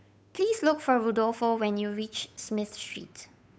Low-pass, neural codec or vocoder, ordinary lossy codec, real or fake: none; codec, 16 kHz, 8 kbps, FunCodec, trained on Chinese and English, 25 frames a second; none; fake